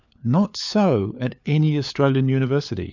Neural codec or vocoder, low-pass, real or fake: codec, 16 kHz, 4 kbps, FunCodec, trained on LibriTTS, 50 frames a second; 7.2 kHz; fake